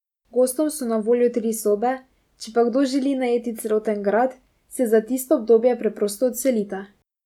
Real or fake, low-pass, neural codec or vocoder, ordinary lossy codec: real; 19.8 kHz; none; none